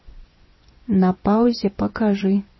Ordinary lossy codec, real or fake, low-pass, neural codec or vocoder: MP3, 24 kbps; fake; 7.2 kHz; vocoder, 24 kHz, 100 mel bands, Vocos